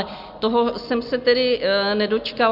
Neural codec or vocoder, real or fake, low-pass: none; real; 5.4 kHz